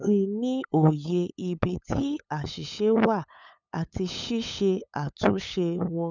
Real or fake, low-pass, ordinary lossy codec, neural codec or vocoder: fake; 7.2 kHz; none; codec, 16 kHz, 8 kbps, FunCodec, trained on LibriTTS, 25 frames a second